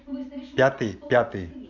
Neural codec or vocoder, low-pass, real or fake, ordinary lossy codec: none; 7.2 kHz; real; none